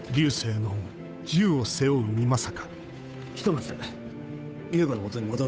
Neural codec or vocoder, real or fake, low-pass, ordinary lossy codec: codec, 16 kHz, 8 kbps, FunCodec, trained on Chinese and English, 25 frames a second; fake; none; none